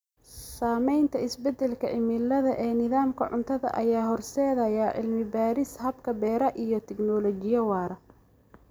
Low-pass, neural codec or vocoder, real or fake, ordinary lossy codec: none; none; real; none